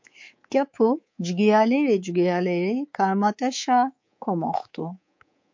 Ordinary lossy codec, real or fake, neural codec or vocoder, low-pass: MP3, 48 kbps; fake; codec, 24 kHz, 3.1 kbps, DualCodec; 7.2 kHz